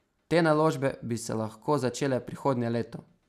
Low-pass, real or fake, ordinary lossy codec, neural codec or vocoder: 14.4 kHz; real; none; none